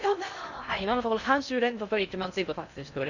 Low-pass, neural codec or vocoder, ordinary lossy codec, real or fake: 7.2 kHz; codec, 16 kHz in and 24 kHz out, 0.6 kbps, FocalCodec, streaming, 2048 codes; none; fake